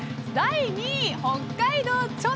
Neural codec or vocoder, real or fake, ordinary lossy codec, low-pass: none; real; none; none